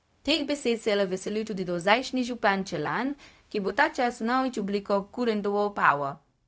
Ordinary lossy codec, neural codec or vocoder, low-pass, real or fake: none; codec, 16 kHz, 0.4 kbps, LongCat-Audio-Codec; none; fake